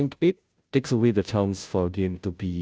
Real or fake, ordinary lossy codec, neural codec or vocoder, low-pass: fake; none; codec, 16 kHz, 0.5 kbps, FunCodec, trained on Chinese and English, 25 frames a second; none